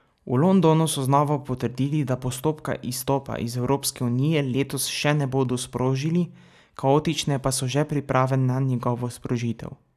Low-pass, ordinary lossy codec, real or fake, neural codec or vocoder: 14.4 kHz; none; real; none